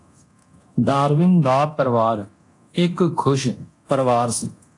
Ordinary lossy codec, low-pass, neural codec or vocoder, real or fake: AAC, 48 kbps; 10.8 kHz; codec, 24 kHz, 0.9 kbps, DualCodec; fake